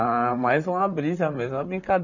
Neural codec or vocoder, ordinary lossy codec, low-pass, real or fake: vocoder, 44.1 kHz, 128 mel bands, Pupu-Vocoder; none; 7.2 kHz; fake